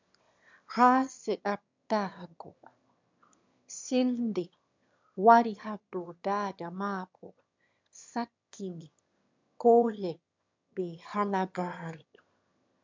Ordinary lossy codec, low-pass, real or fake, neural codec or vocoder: MP3, 64 kbps; 7.2 kHz; fake; autoencoder, 22.05 kHz, a latent of 192 numbers a frame, VITS, trained on one speaker